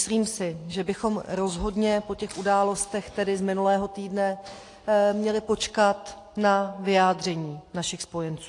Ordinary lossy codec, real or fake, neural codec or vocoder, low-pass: AAC, 48 kbps; real; none; 10.8 kHz